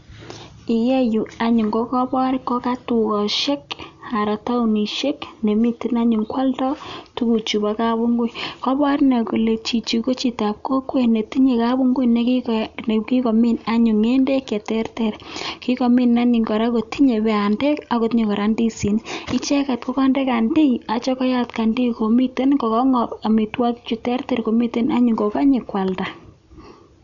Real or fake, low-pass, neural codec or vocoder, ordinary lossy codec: real; 7.2 kHz; none; none